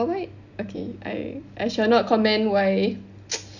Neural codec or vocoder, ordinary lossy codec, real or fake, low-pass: none; none; real; 7.2 kHz